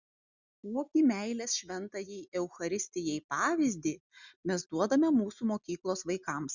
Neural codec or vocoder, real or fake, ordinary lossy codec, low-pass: none; real; Opus, 64 kbps; 7.2 kHz